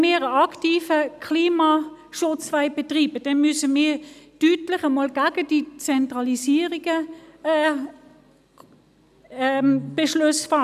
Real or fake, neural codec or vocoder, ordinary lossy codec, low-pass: real; none; none; 14.4 kHz